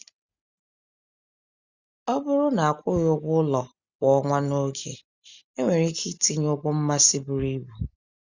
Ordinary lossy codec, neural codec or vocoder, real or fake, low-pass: Opus, 64 kbps; none; real; 7.2 kHz